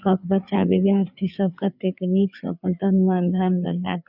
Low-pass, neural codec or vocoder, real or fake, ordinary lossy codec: 5.4 kHz; codec, 16 kHz, 4 kbps, FreqCodec, larger model; fake; none